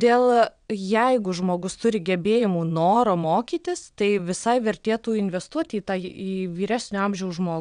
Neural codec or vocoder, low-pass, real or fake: vocoder, 22.05 kHz, 80 mel bands, WaveNeXt; 9.9 kHz; fake